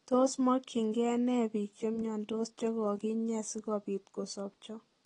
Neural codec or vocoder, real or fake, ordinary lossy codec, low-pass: none; real; AAC, 32 kbps; 10.8 kHz